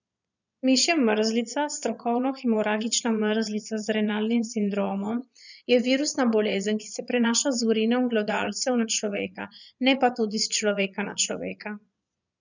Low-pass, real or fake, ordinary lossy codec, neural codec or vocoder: 7.2 kHz; fake; none; vocoder, 22.05 kHz, 80 mel bands, Vocos